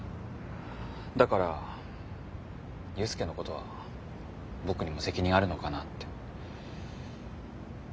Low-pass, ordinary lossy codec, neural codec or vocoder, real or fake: none; none; none; real